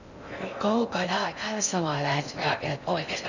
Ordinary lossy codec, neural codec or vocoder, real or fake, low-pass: none; codec, 16 kHz in and 24 kHz out, 0.6 kbps, FocalCodec, streaming, 4096 codes; fake; 7.2 kHz